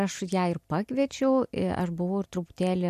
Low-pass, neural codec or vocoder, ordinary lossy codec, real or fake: 14.4 kHz; none; MP3, 64 kbps; real